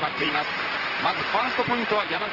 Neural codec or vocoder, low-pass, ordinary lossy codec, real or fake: vocoder, 44.1 kHz, 128 mel bands, Pupu-Vocoder; 5.4 kHz; Opus, 16 kbps; fake